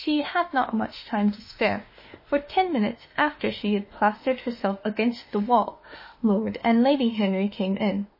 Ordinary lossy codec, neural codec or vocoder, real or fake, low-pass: MP3, 24 kbps; autoencoder, 48 kHz, 32 numbers a frame, DAC-VAE, trained on Japanese speech; fake; 5.4 kHz